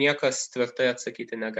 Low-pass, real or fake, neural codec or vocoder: 10.8 kHz; real; none